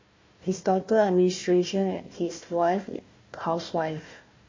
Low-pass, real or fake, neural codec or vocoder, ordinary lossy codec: 7.2 kHz; fake; codec, 16 kHz, 1 kbps, FunCodec, trained on Chinese and English, 50 frames a second; MP3, 32 kbps